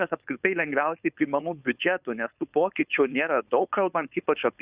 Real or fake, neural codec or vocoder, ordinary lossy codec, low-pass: fake; codec, 16 kHz, 4.8 kbps, FACodec; Opus, 64 kbps; 3.6 kHz